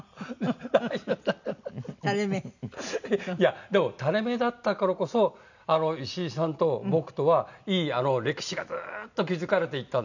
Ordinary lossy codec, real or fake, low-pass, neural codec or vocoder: none; real; 7.2 kHz; none